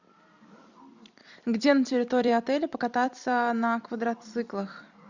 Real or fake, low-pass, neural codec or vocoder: fake; 7.2 kHz; vocoder, 44.1 kHz, 128 mel bands every 256 samples, BigVGAN v2